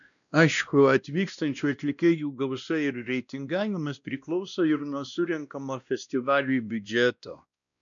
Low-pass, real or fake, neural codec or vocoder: 7.2 kHz; fake; codec, 16 kHz, 1 kbps, X-Codec, WavLM features, trained on Multilingual LibriSpeech